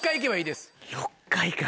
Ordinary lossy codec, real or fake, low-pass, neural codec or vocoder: none; real; none; none